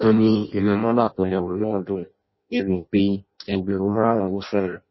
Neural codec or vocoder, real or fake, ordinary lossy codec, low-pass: codec, 16 kHz in and 24 kHz out, 0.6 kbps, FireRedTTS-2 codec; fake; MP3, 24 kbps; 7.2 kHz